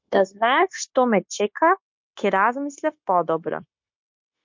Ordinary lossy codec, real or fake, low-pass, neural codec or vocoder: MP3, 48 kbps; fake; 7.2 kHz; codec, 16 kHz, 0.9 kbps, LongCat-Audio-Codec